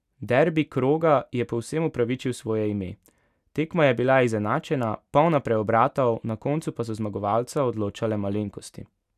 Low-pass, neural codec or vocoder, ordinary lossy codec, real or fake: 14.4 kHz; none; none; real